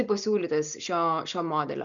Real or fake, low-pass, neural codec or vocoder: real; 7.2 kHz; none